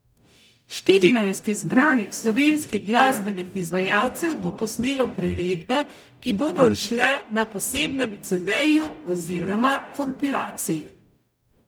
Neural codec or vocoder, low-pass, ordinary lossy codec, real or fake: codec, 44.1 kHz, 0.9 kbps, DAC; none; none; fake